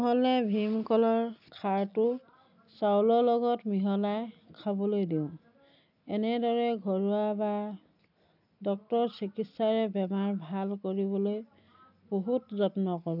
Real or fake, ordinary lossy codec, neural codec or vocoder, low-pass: real; none; none; 5.4 kHz